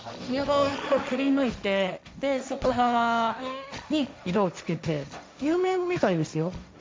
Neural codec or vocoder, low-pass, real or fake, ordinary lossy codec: codec, 16 kHz, 1.1 kbps, Voila-Tokenizer; none; fake; none